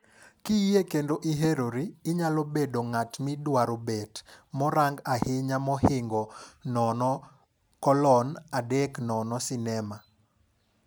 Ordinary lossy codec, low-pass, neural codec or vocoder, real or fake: none; none; none; real